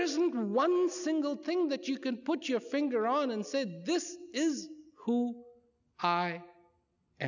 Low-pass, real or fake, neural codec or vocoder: 7.2 kHz; real; none